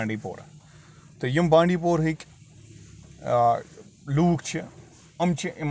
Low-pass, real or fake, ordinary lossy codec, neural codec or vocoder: none; real; none; none